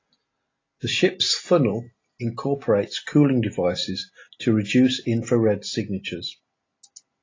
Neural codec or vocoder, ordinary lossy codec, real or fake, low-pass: none; AAC, 32 kbps; real; 7.2 kHz